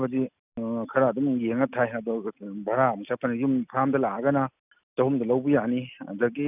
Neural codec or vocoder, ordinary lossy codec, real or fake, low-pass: none; none; real; 3.6 kHz